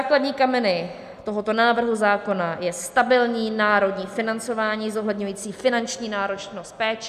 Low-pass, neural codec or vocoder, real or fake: 14.4 kHz; none; real